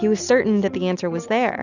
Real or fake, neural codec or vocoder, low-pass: real; none; 7.2 kHz